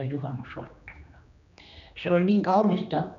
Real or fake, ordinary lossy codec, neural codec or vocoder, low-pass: fake; none; codec, 16 kHz, 2 kbps, X-Codec, HuBERT features, trained on general audio; 7.2 kHz